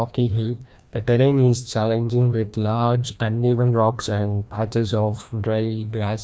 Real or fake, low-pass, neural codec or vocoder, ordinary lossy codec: fake; none; codec, 16 kHz, 1 kbps, FreqCodec, larger model; none